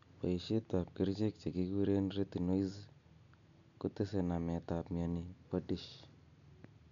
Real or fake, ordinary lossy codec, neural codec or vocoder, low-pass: real; none; none; 7.2 kHz